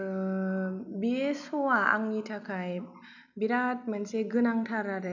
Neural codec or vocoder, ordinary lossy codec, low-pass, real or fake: none; none; 7.2 kHz; real